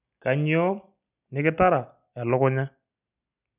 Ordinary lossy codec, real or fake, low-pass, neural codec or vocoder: none; real; 3.6 kHz; none